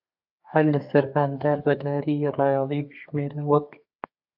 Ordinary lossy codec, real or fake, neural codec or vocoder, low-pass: AAC, 48 kbps; fake; codec, 32 kHz, 1.9 kbps, SNAC; 5.4 kHz